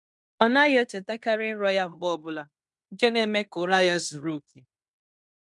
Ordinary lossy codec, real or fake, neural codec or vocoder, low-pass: none; fake; codec, 16 kHz in and 24 kHz out, 0.9 kbps, LongCat-Audio-Codec, fine tuned four codebook decoder; 10.8 kHz